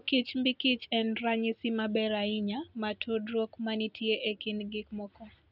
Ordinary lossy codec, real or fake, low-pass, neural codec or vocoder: none; real; 5.4 kHz; none